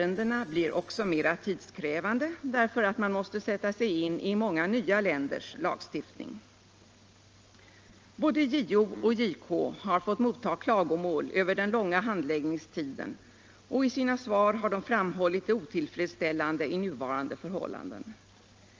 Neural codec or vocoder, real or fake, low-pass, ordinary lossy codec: none; real; 7.2 kHz; Opus, 24 kbps